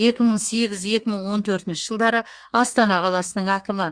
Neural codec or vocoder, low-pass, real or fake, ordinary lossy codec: codec, 32 kHz, 1.9 kbps, SNAC; 9.9 kHz; fake; Opus, 64 kbps